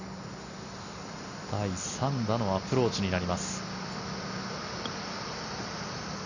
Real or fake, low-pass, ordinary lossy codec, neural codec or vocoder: real; 7.2 kHz; none; none